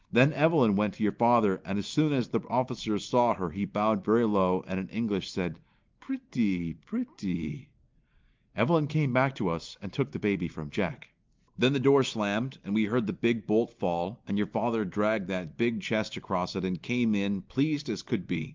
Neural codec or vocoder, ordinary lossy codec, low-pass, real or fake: none; Opus, 32 kbps; 7.2 kHz; real